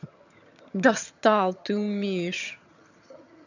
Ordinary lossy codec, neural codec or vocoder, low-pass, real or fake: none; vocoder, 22.05 kHz, 80 mel bands, HiFi-GAN; 7.2 kHz; fake